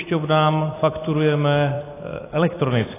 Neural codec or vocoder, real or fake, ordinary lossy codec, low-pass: vocoder, 44.1 kHz, 128 mel bands every 256 samples, BigVGAN v2; fake; AAC, 16 kbps; 3.6 kHz